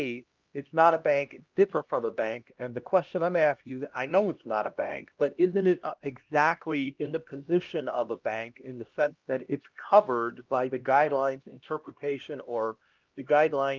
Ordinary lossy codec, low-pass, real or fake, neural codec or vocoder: Opus, 24 kbps; 7.2 kHz; fake; codec, 16 kHz, 1 kbps, X-Codec, HuBERT features, trained on LibriSpeech